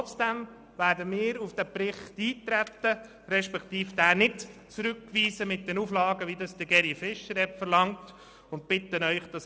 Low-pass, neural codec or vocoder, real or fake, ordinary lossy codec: none; none; real; none